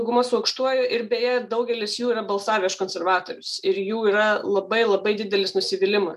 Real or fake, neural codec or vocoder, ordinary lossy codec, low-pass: real; none; MP3, 96 kbps; 14.4 kHz